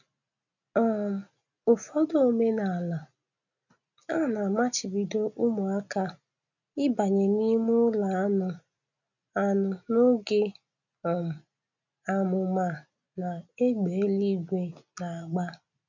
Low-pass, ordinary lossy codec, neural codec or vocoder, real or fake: 7.2 kHz; none; none; real